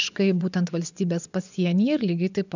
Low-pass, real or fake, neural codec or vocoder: 7.2 kHz; fake; vocoder, 24 kHz, 100 mel bands, Vocos